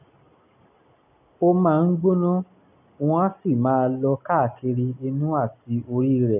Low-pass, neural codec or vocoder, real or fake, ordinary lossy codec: 3.6 kHz; none; real; none